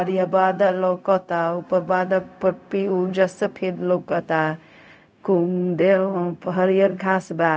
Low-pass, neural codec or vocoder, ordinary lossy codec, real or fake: none; codec, 16 kHz, 0.4 kbps, LongCat-Audio-Codec; none; fake